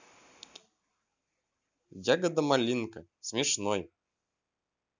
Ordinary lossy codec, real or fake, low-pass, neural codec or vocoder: none; real; none; none